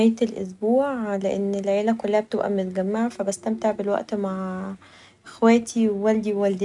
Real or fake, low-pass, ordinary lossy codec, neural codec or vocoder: real; 10.8 kHz; none; none